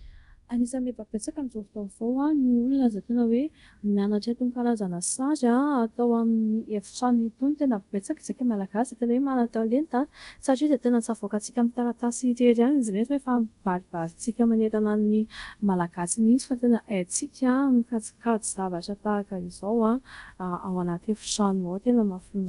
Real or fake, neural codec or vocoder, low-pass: fake; codec, 24 kHz, 0.5 kbps, DualCodec; 10.8 kHz